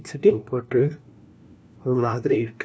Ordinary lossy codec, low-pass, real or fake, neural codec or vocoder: none; none; fake; codec, 16 kHz, 1 kbps, FunCodec, trained on LibriTTS, 50 frames a second